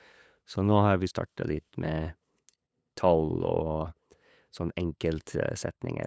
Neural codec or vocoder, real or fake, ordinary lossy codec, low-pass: codec, 16 kHz, 8 kbps, FunCodec, trained on LibriTTS, 25 frames a second; fake; none; none